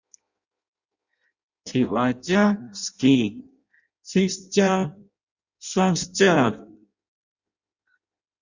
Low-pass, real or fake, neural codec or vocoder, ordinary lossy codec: 7.2 kHz; fake; codec, 16 kHz in and 24 kHz out, 0.6 kbps, FireRedTTS-2 codec; Opus, 64 kbps